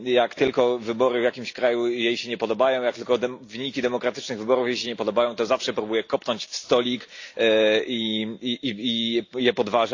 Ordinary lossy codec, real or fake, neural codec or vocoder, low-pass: AAC, 48 kbps; real; none; 7.2 kHz